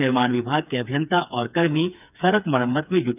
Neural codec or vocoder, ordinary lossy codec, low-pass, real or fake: codec, 16 kHz, 4 kbps, FreqCodec, smaller model; none; 3.6 kHz; fake